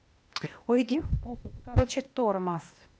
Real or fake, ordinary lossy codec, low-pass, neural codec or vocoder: fake; none; none; codec, 16 kHz, 0.8 kbps, ZipCodec